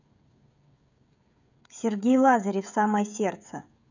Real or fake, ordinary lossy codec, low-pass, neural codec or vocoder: fake; none; 7.2 kHz; codec, 16 kHz, 16 kbps, FreqCodec, smaller model